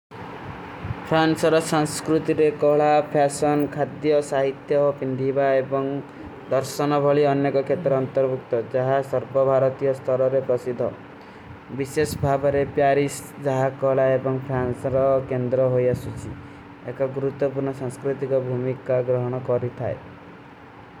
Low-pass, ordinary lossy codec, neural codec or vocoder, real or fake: 19.8 kHz; none; none; real